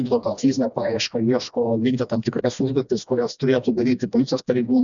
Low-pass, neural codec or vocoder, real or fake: 7.2 kHz; codec, 16 kHz, 1 kbps, FreqCodec, smaller model; fake